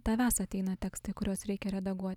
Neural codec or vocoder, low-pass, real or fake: none; 19.8 kHz; real